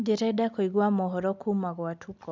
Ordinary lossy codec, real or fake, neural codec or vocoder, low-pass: none; real; none; none